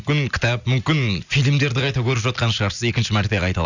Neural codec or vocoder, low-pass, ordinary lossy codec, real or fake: none; 7.2 kHz; none; real